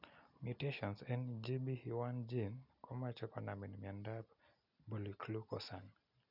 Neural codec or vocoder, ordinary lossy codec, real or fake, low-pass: none; none; real; 5.4 kHz